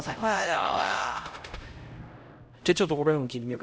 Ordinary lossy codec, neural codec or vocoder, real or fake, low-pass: none; codec, 16 kHz, 0.5 kbps, X-Codec, HuBERT features, trained on LibriSpeech; fake; none